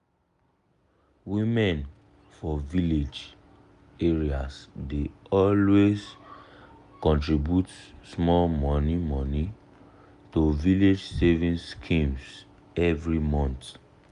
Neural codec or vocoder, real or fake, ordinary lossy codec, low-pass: none; real; none; 10.8 kHz